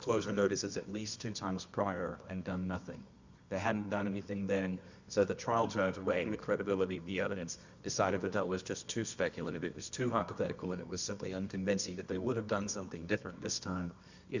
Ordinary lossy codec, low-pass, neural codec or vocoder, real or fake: Opus, 64 kbps; 7.2 kHz; codec, 24 kHz, 0.9 kbps, WavTokenizer, medium music audio release; fake